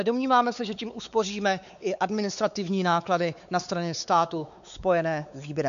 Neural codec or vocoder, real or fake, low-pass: codec, 16 kHz, 4 kbps, X-Codec, WavLM features, trained on Multilingual LibriSpeech; fake; 7.2 kHz